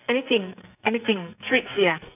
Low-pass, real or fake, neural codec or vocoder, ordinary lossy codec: 3.6 kHz; fake; codec, 44.1 kHz, 2.6 kbps, SNAC; none